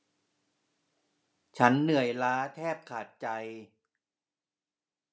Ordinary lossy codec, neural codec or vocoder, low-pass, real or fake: none; none; none; real